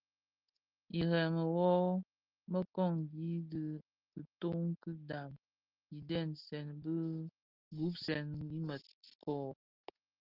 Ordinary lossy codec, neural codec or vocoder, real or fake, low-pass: Opus, 24 kbps; none; real; 5.4 kHz